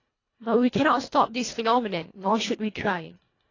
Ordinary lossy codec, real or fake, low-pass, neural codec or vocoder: AAC, 32 kbps; fake; 7.2 kHz; codec, 24 kHz, 1.5 kbps, HILCodec